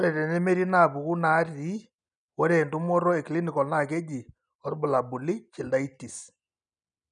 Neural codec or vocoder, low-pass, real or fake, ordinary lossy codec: none; 9.9 kHz; real; none